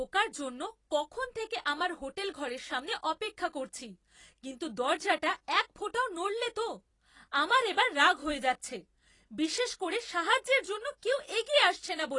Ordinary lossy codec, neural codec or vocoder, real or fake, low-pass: AAC, 32 kbps; vocoder, 44.1 kHz, 128 mel bands every 256 samples, BigVGAN v2; fake; 10.8 kHz